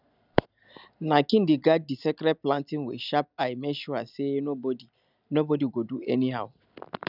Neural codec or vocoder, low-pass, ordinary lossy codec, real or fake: none; 5.4 kHz; none; real